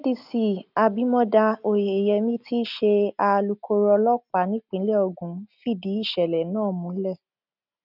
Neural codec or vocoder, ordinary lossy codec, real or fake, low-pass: none; none; real; 5.4 kHz